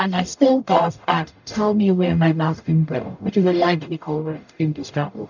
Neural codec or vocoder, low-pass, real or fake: codec, 44.1 kHz, 0.9 kbps, DAC; 7.2 kHz; fake